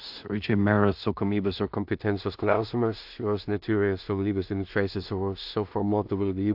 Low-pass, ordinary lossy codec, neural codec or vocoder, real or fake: 5.4 kHz; AAC, 48 kbps; codec, 16 kHz in and 24 kHz out, 0.4 kbps, LongCat-Audio-Codec, two codebook decoder; fake